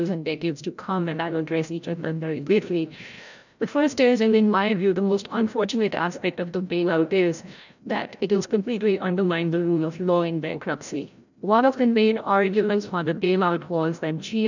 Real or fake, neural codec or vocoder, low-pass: fake; codec, 16 kHz, 0.5 kbps, FreqCodec, larger model; 7.2 kHz